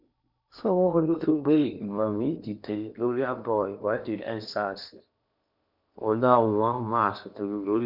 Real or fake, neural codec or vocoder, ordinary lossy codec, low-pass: fake; codec, 16 kHz in and 24 kHz out, 0.8 kbps, FocalCodec, streaming, 65536 codes; none; 5.4 kHz